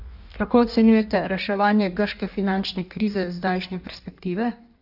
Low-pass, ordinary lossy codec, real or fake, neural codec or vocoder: 5.4 kHz; none; fake; codec, 16 kHz in and 24 kHz out, 1.1 kbps, FireRedTTS-2 codec